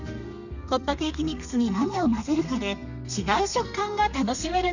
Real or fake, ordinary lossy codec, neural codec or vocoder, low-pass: fake; none; codec, 32 kHz, 1.9 kbps, SNAC; 7.2 kHz